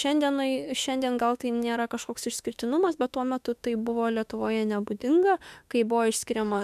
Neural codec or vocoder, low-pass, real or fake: autoencoder, 48 kHz, 32 numbers a frame, DAC-VAE, trained on Japanese speech; 14.4 kHz; fake